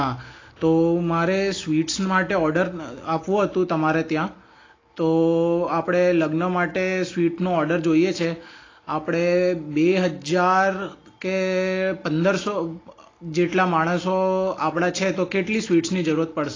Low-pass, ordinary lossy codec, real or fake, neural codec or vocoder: 7.2 kHz; AAC, 32 kbps; real; none